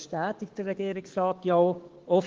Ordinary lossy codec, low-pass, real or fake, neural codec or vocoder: Opus, 16 kbps; 7.2 kHz; fake; codec, 16 kHz, 6 kbps, DAC